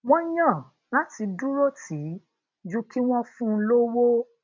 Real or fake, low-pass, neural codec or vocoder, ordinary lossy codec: real; 7.2 kHz; none; MP3, 48 kbps